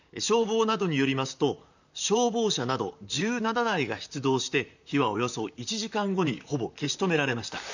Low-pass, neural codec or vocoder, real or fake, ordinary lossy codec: 7.2 kHz; vocoder, 44.1 kHz, 128 mel bands, Pupu-Vocoder; fake; none